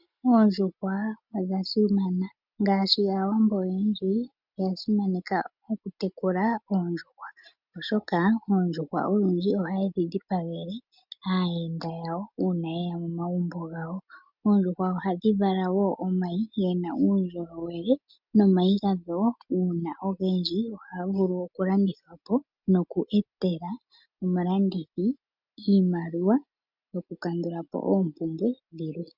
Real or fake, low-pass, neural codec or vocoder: real; 5.4 kHz; none